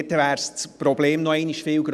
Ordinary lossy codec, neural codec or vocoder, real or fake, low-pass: none; none; real; none